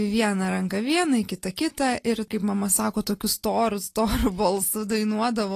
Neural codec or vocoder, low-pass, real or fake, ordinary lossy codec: none; 14.4 kHz; real; AAC, 48 kbps